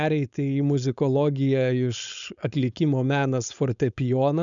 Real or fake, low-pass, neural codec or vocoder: fake; 7.2 kHz; codec, 16 kHz, 4.8 kbps, FACodec